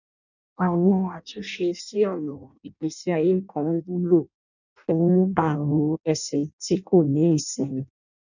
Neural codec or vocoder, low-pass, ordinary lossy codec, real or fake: codec, 16 kHz in and 24 kHz out, 0.6 kbps, FireRedTTS-2 codec; 7.2 kHz; none; fake